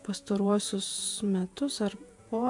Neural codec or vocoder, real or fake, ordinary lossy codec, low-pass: vocoder, 48 kHz, 128 mel bands, Vocos; fake; AAC, 64 kbps; 10.8 kHz